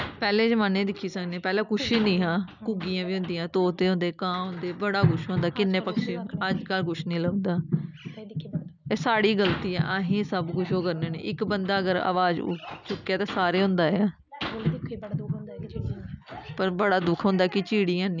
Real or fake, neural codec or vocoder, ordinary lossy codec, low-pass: real; none; none; 7.2 kHz